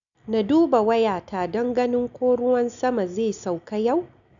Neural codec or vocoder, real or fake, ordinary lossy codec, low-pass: none; real; none; 7.2 kHz